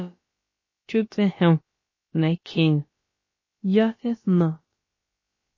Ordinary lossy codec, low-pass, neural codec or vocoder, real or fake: MP3, 32 kbps; 7.2 kHz; codec, 16 kHz, about 1 kbps, DyCAST, with the encoder's durations; fake